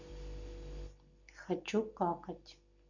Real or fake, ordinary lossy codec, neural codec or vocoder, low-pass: real; none; none; 7.2 kHz